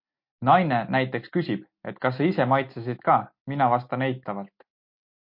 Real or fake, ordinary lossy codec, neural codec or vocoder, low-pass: real; MP3, 32 kbps; none; 5.4 kHz